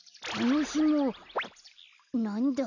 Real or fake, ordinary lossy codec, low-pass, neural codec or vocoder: real; none; 7.2 kHz; none